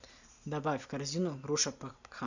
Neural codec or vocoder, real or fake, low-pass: none; real; 7.2 kHz